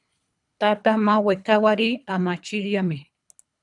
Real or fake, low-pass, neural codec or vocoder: fake; 10.8 kHz; codec, 24 kHz, 3 kbps, HILCodec